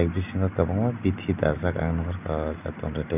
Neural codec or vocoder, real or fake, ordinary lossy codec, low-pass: none; real; none; 3.6 kHz